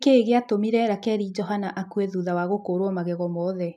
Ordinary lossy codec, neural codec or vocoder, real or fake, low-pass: none; none; real; 14.4 kHz